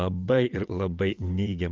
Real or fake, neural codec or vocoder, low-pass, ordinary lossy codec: fake; vocoder, 22.05 kHz, 80 mel bands, WaveNeXt; 7.2 kHz; Opus, 32 kbps